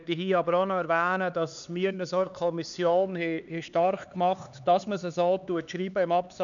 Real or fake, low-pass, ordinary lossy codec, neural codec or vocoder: fake; 7.2 kHz; none; codec, 16 kHz, 4 kbps, X-Codec, HuBERT features, trained on LibriSpeech